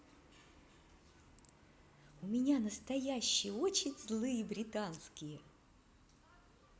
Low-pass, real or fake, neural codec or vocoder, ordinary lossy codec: none; real; none; none